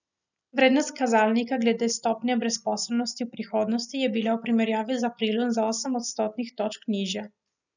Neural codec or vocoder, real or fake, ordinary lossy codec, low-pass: none; real; none; 7.2 kHz